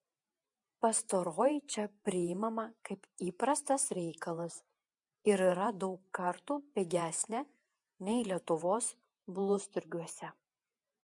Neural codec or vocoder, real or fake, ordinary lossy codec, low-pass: vocoder, 48 kHz, 128 mel bands, Vocos; fake; MP3, 64 kbps; 10.8 kHz